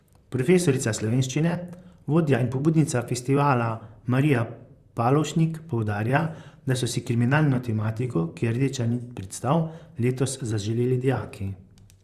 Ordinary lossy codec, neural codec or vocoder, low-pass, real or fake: Opus, 64 kbps; vocoder, 44.1 kHz, 128 mel bands, Pupu-Vocoder; 14.4 kHz; fake